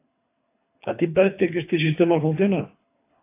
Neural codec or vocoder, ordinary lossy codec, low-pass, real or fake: codec, 24 kHz, 3 kbps, HILCodec; AAC, 24 kbps; 3.6 kHz; fake